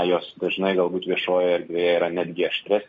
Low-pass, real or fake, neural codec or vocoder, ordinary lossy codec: 7.2 kHz; real; none; MP3, 32 kbps